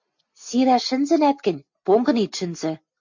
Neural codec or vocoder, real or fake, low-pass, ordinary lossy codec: none; real; 7.2 kHz; MP3, 48 kbps